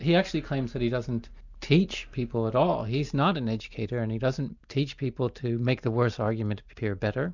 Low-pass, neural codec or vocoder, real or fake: 7.2 kHz; none; real